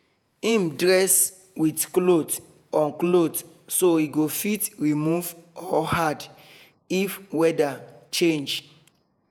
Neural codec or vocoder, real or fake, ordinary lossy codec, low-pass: vocoder, 48 kHz, 128 mel bands, Vocos; fake; none; none